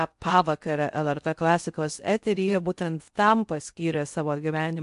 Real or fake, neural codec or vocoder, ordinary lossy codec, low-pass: fake; codec, 16 kHz in and 24 kHz out, 0.6 kbps, FocalCodec, streaming, 4096 codes; MP3, 64 kbps; 10.8 kHz